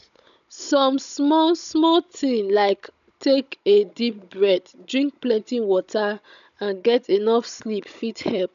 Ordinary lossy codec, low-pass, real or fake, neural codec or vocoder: none; 7.2 kHz; fake; codec, 16 kHz, 16 kbps, FunCodec, trained on Chinese and English, 50 frames a second